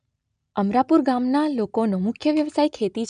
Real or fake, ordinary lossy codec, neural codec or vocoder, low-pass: real; none; none; 9.9 kHz